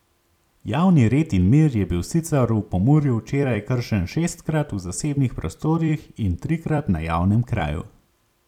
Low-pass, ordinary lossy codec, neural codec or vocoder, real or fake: 19.8 kHz; none; vocoder, 44.1 kHz, 128 mel bands every 256 samples, BigVGAN v2; fake